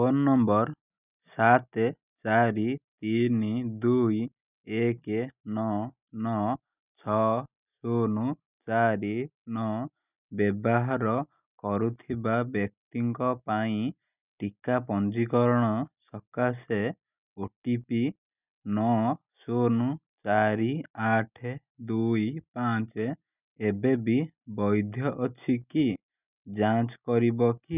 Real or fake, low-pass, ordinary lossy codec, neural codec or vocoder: real; 3.6 kHz; none; none